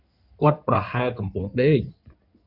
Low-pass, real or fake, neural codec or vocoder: 5.4 kHz; fake; codec, 16 kHz in and 24 kHz out, 2.2 kbps, FireRedTTS-2 codec